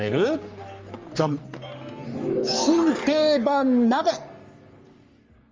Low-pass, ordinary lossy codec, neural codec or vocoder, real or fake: 7.2 kHz; Opus, 32 kbps; codec, 44.1 kHz, 3.4 kbps, Pupu-Codec; fake